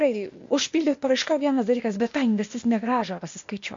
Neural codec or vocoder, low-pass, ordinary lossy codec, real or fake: codec, 16 kHz, 0.8 kbps, ZipCodec; 7.2 kHz; MP3, 48 kbps; fake